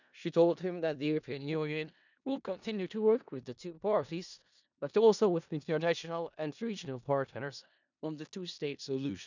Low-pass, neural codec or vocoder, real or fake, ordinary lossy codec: 7.2 kHz; codec, 16 kHz in and 24 kHz out, 0.4 kbps, LongCat-Audio-Codec, four codebook decoder; fake; none